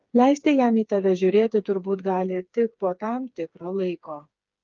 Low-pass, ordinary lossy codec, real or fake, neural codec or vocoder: 7.2 kHz; Opus, 24 kbps; fake; codec, 16 kHz, 4 kbps, FreqCodec, smaller model